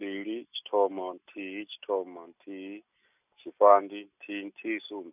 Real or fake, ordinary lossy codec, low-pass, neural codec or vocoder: real; none; 3.6 kHz; none